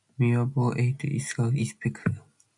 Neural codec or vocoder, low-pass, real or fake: none; 10.8 kHz; real